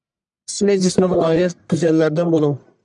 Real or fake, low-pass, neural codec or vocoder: fake; 10.8 kHz; codec, 44.1 kHz, 1.7 kbps, Pupu-Codec